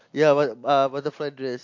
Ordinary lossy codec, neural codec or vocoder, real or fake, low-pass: MP3, 48 kbps; none; real; 7.2 kHz